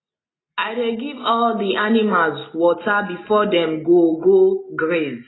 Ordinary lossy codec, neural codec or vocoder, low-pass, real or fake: AAC, 16 kbps; none; 7.2 kHz; real